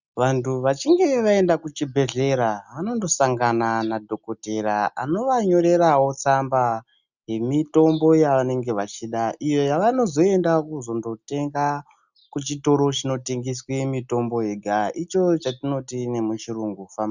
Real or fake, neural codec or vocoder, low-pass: real; none; 7.2 kHz